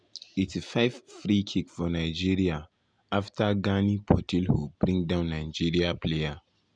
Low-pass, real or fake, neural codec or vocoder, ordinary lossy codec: 9.9 kHz; real; none; none